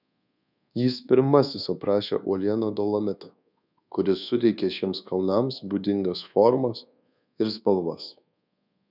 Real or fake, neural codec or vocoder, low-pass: fake; codec, 24 kHz, 1.2 kbps, DualCodec; 5.4 kHz